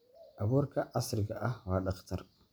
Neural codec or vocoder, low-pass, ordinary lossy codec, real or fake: none; none; none; real